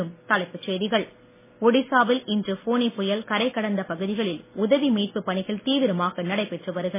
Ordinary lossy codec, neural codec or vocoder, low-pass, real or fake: MP3, 16 kbps; none; 3.6 kHz; real